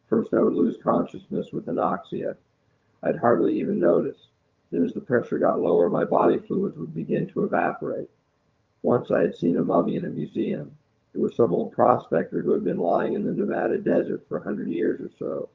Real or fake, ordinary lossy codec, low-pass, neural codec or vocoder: fake; Opus, 24 kbps; 7.2 kHz; vocoder, 22.05 kHz, 80 mel bands, HiFi-GAN